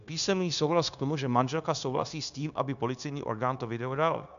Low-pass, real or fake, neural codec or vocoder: 7.2 kHz; fake; codec, 16 kHz, 0.9 kbps, LongCat-Audio-Codec